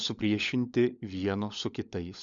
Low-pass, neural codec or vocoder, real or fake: 7.2 kHz; codec, 16 kHz, 16 kbps, FunCodec, trained on LibriTTS, 50 frames a second; fake